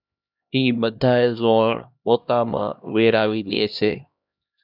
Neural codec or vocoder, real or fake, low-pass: codec, 16 kHz, 1 kbps, X-Codec, HuBERT features, trained on LibriSpeech; fake; 5.4 kHz